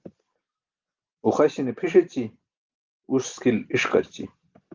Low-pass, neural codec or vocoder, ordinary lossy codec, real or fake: 7.2 kHz; none; Opus, 32 kbps; real